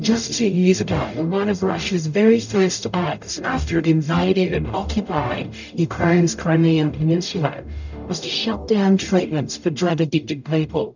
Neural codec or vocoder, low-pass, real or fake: codec, 44.1 kHz, 0.9 kbps, DAC; 7.2 kHz; fake